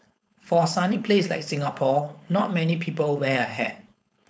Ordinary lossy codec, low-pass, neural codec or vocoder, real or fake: none; none; codec, 16 kHz, 4.8 kbps, FACodec; fake